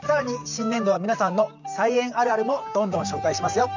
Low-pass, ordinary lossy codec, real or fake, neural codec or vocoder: 7.2 kHz; none; fake; vocoder, 44.1 kHz, 128 mel bands, Pupu-Vocoder